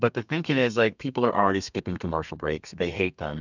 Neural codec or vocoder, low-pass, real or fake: codec, 32 kHz, 1.9 kbps, SNAC; 7.2 kHz; fake